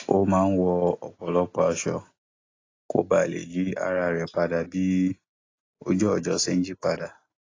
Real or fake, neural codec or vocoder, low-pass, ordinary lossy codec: real; none; 7.2 kHz; AAC, 32 kbps